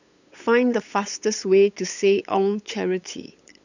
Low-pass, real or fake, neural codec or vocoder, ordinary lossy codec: 7.2 kHz; fake; codec, 16 kHz, 8 kbps, FunCodec, trained on LibriTTS, 25 frames a second; none